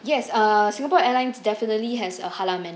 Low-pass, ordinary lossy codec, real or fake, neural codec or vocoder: none; none; real; none